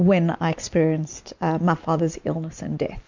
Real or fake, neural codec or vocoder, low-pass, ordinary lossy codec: real; none; 7.2 kHz; MP3, 64 kbps